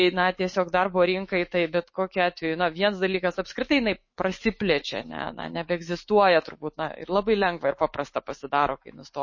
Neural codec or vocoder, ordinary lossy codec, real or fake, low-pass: autoencoder, 48 kHz, 128 numbers a frame, DAC-VAE, trained on Japanese speech; MP3, 32 kbps; fake; 7.2 kHz